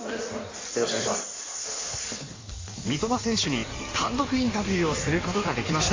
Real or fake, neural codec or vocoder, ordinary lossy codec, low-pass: fake; codec, 16 kHz in and 24 kHz out, 1.1 kbps, FireRedTTS-2 codec; AAC, 32 kbps; 7.2 kHz